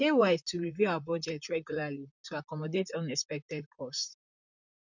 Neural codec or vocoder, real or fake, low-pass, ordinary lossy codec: vocoder, 44.1 kHz, 128 mel bands, Pupu-Vocoder; fake; 7.2 kHz; none